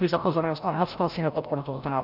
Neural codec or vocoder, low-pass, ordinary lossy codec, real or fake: codec, 16 kHz, 0.5 kbps, FreqCodec, larger model; 5.4 kHz; AAC, 48 kbps; fake